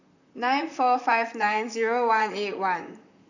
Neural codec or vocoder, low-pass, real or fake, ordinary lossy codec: vocoder, 44.1 kHz, 128 mel bands, Pupu-Vocoder; 7.2 kHz; fake; none